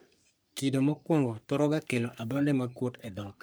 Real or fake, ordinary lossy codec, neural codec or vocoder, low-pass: fake; none; codec, 44.1 kHz, 3.4 kbps, Pupu-Codec; none